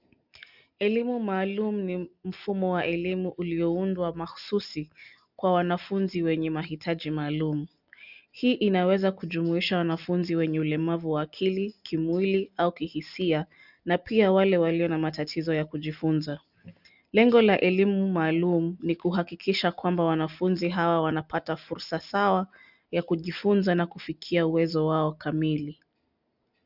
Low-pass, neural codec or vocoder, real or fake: 5.4 kHz; none; real